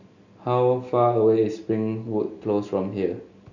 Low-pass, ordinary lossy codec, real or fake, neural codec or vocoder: 7.2 kHz; none; real; none